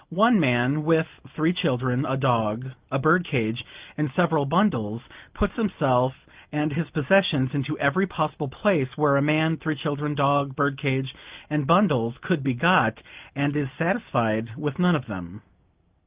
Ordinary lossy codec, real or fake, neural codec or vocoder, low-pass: Opus, 32 kbps; real; none; 3.6 kHz